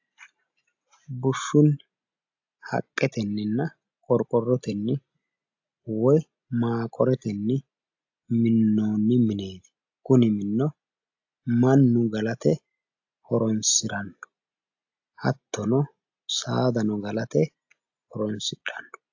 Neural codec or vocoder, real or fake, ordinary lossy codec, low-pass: none; real; AAC, 48 kbps; 7.2 kHz